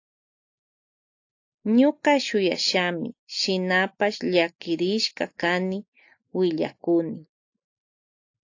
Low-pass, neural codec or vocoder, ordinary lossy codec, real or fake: 7.2 kHz; none; AAC, 48 kbps; real